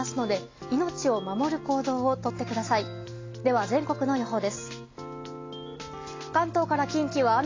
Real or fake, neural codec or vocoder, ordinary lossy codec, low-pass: real; none; AAC, 32 kbps; 7.2 kHz